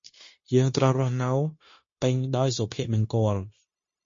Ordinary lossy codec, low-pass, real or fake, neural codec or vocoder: MP3, 32 kbps; 7.2 kHz; fake; codec, 16 kHz, 0.9 kbps, LongCat-Audio-Codec